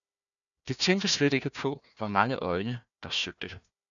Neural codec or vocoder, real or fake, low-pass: codec, 16 kHz, 1 kbps, FunCodec, trained on Chinese and English, 50 frames a second; fake; 7.2 kHz